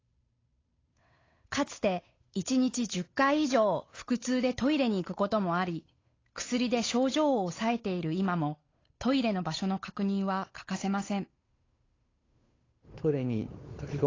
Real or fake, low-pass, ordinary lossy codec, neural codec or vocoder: fake; 7.2 kHz; AAC, 32 kbps; codec, 16 kHz, 8 kbps, FunCodec, trained on Chinese and English, 25 frames a second